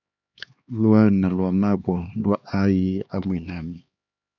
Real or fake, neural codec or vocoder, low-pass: fake; codec, 16 kHz, 2 kbps, X-Codec, HuBERT features, trained on LibriSpeech; 7.2 kHz